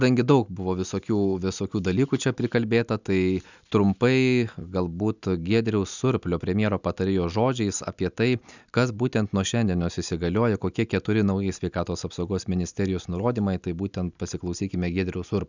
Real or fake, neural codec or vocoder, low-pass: real; none; 7.2 kHz